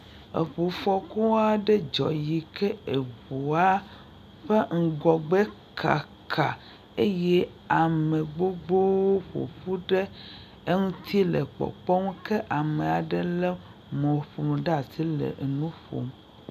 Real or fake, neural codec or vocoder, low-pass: real; none; 14.4 kHz